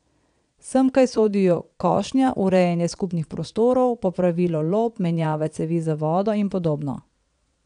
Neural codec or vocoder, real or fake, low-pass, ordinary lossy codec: vocoder, 22.05 kHz, 80 mel bands, Vocos; fake; 9.9 kHz; none